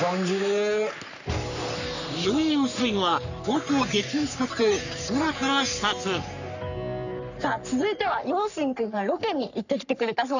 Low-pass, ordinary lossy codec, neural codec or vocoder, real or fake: 7.2 kHz; none; codec, 44.1 kHz, 3.4 kbps, Pupu-Codec; fake